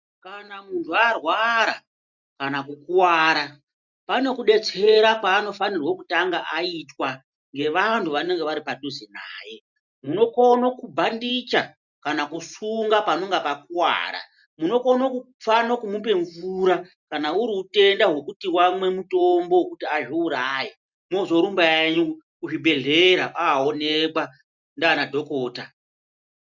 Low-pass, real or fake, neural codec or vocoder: 7.2 kHz; real; none